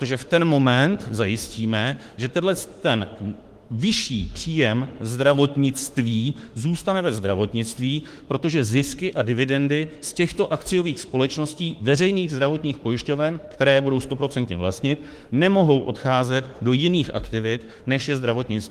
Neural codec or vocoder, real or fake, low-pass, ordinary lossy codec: autoencoder, 48 kHz, 32 numbers a frame, DAC-VAE, trained on Japanese speech; fake; 14.4 kHz; Opus, 24 kbps